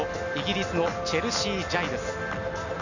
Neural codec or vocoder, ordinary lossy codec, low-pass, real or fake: none; none; 7.2 kHz; real